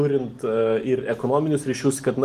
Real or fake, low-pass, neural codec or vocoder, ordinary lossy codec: real; 14.4 kHz; none; Opus, 24 kbps